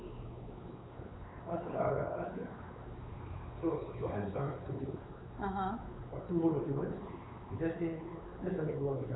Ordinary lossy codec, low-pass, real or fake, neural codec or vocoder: AAC, 16 kbps; 7.2 kHz; fake; codec, 16 kHz, 4 kbps, X-Codec, WavLM features, trained on Multilingual LibriSpeech